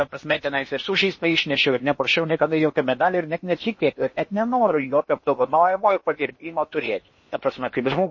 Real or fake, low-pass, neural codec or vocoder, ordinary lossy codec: fake; 7.2 kHz; codec, 16 kHz in and 24 kHz out, 0.8 kbps, FocalCodec, streaming, 65536 codes; MP3, 32 kbps